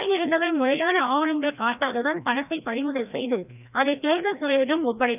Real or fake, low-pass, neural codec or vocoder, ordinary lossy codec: fake; 3.6 kHz; codec, 16 kHz, 1 kbps, FreqCodec, larger model; none